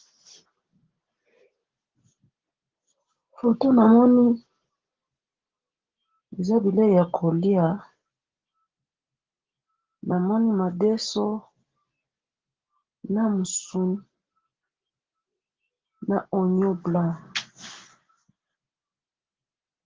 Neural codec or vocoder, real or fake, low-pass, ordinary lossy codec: none; real; 7.2 kHz; Opus, 16 kbps